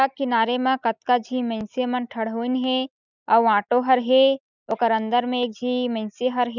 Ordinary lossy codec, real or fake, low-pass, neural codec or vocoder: none; real; 7.2 kHz; none